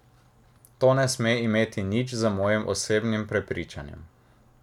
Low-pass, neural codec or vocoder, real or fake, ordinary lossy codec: 19.8 kHz; none; real; none